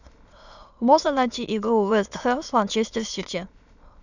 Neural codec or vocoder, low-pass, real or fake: autoencoder, 22.05 kHz, a latent of 192 numbers a frame, VITS, trained on many speakers; 7.2 kHz; fake